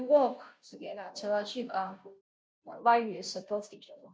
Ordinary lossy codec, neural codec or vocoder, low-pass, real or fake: none; codec, 16 kHz, 0.5 kbps, FunCodec, trained on Chinese and English, 25 frames a second; none; fake